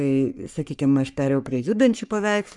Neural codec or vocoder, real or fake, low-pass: codec, 44.1 kHz, 3.4 kbps, Pupu-Codec; fake; 10.8 kHz